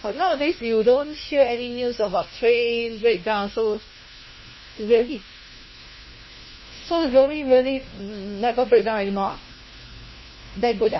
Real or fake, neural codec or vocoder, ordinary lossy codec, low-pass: fake; codec, 16 kHz, 1 kbps, FunCodec, trained on LibriTTS, 50 frames a second; MP3, 24 kbps; 7.2 kHz